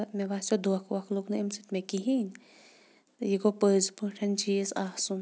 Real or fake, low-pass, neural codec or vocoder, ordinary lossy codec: real; none; none; none